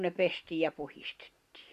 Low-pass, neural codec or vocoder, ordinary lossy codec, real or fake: 14.4 kHz; none; Opus, 64 kbps; real